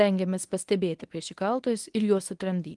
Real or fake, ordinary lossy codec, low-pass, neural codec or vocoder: fake; Opus, 24 kbps; 10.8 kHz; codec, 24 kHz, 0.9 kbps, WavTokenizer, medium speech release version 1